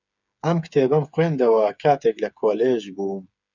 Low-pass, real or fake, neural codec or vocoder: 7.2 kHz; fake; codec, 16 kHz, 8 kbps, FreqCodec, smaller model